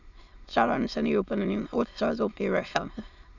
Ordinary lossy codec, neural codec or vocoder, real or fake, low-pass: none; autoencoder, 22.05 kHz, a latent of 192 numbers a frame, VITS, trained on many speakers; fake; 7.2 kHz